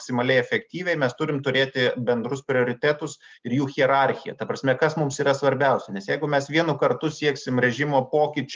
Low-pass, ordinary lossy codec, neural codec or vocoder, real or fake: 7.2 kHz; Opus, 24 kbps; none; real